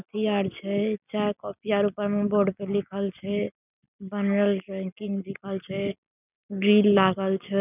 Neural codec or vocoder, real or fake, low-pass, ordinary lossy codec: none; real; 3.6 kHz; none